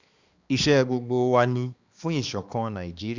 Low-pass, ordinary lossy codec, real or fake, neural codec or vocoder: 7.2 kHz; Opus, 64 kbps; fake; codec, 16 kHz, 2 kbps, X-Codec, HuBERT features, trained on balanced general audio